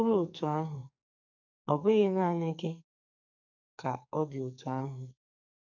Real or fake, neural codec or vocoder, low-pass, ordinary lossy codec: fake; codec, 44.1 kHz, 2.6 kbps, SNAC; 7.2 kHz; none